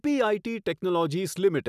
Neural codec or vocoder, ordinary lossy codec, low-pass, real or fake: none; none; 14.4 kHz; real